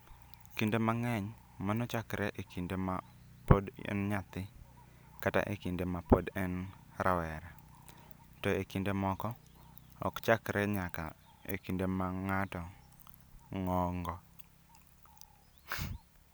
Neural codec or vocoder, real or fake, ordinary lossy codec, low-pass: none; real; none; none